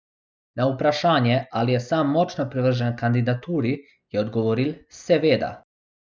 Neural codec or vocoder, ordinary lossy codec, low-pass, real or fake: none; none; none; real